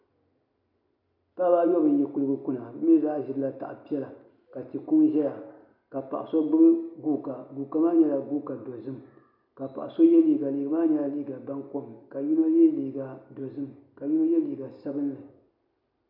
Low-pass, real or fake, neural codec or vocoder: 5.4 kHz; real; none